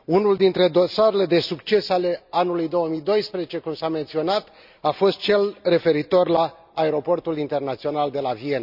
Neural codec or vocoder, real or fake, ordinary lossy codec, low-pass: none; real; none; 5.4 kHz